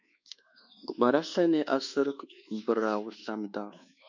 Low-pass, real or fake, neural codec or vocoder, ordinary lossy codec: 7.2 kHz; fake; codec, 24 kHz, 1.2 kbps, DualCodec; MP3, 64 kbps